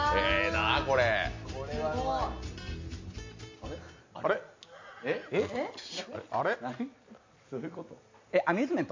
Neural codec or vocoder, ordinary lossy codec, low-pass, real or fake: none; MP3, 48 kbps; 7.2 kHz; real